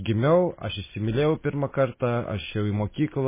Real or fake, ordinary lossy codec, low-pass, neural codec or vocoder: real; MP3, 16 kbps; 3.6 kHz; none